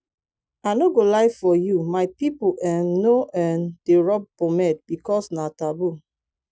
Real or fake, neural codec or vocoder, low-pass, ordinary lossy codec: real; none; none; none